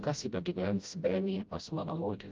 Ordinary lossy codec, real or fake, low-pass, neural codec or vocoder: Opus, 32 kbps; fake; 7.2 kHz; codec, 16 kHz, 0.5 kbps, FreqCodec, smaller model